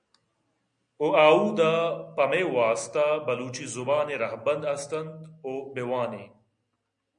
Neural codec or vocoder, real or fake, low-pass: none; real; 9.9 kHz